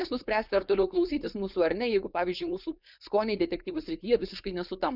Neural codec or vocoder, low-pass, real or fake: codec, 16 kHz, 4.8 kbps, FACodec; 5.4 kHz; fake